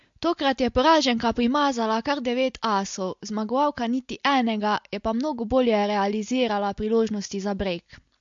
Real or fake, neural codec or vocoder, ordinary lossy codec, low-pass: real; none; MP3, 48 kbps; 7.2 kHz